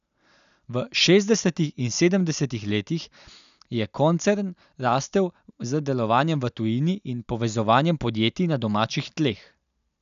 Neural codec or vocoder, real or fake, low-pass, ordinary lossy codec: none; real; 7.2 kHz; none